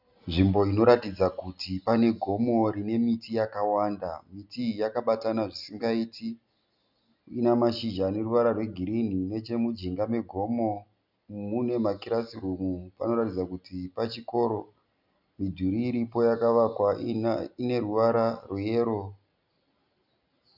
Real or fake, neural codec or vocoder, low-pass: real; none; 5.4 kHz